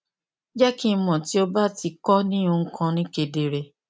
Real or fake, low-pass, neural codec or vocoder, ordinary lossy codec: real; none; none; none